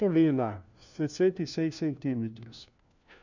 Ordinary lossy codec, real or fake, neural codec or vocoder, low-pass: none; fake; codec, 16 kHz, 1 kbps, FunCodec, trained on LibriTTS, 50 frames a second; 7.2 kHz